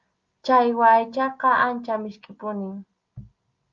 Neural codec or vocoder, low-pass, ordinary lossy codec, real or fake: none; 7.2 kHz; Opus, 32 kbps; real